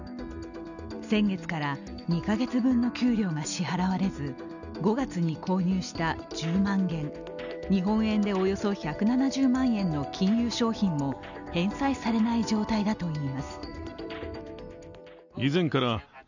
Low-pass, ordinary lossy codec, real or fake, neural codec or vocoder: 7.2 kHz; none; real; none